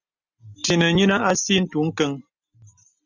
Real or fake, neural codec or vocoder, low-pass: real; none; 7.2 kHz